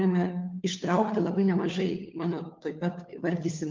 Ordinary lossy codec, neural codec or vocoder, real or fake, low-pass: Opus, 32 kbps; codec, 16 kHz, 4 kbps, FunCodec, trained on LibriTTS, 50 frames a second; fake; 7.2 kHz